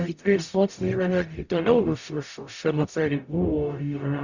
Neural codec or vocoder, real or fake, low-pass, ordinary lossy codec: codec, 44.1 kHz, 0.9 kbps, DAC; fake; 7.2 kHz; Opus, 64 kbps